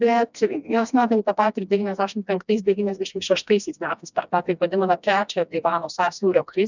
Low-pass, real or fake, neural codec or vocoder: 7.2 kHz; fake; codec, 16 kHz, 1 kbps, FreqCodec, smaller model